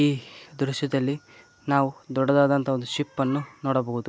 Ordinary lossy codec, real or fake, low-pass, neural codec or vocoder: none; real; none; none